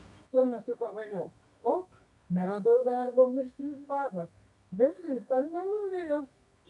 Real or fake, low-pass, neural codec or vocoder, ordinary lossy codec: fake; 10.8 kHz; codec, 24 kHz, 0.9 kbps, WavTokenizer, medium music audio release; MP3, 96 kbps